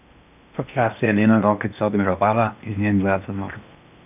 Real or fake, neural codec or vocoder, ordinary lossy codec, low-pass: fake; codec, 16 kHz in and 24 kHz out, 0.8 kbps, FocalCodec, streaming, 65536 codes; none; 3.6 kHz